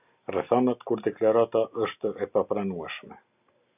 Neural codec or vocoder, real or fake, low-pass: none; real; 3.6 kHz